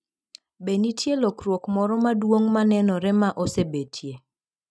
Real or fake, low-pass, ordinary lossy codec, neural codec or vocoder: real; none; none; none